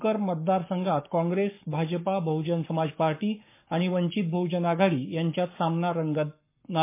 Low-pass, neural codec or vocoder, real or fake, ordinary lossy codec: 3.6 kHz; codec, 44.1 kHz, 7.8 kbps, Pupu-Codec; fake; MP3, 24 kbps